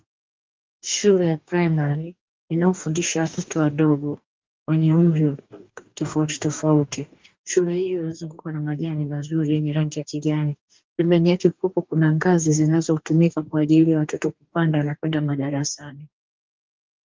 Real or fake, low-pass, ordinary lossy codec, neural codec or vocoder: fake; 7.2 kHz; Opus, 24 kbps; codec, 44.1 kHz, 2.6 kbps, DAC